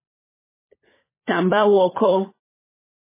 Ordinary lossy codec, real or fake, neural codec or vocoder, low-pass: MP3, 16 kbps; fake; codec, 16 kHz, 16 kbps, FunCodec, trained on LibriTTS, 50 frames a second; 3.6 kHz